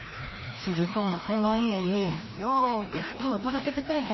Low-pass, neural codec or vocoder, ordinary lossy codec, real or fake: 7.2 kHz; codec, 16 kHz, 1 kbps, FreqCodec, larger model; MP3, 24 kbps; fake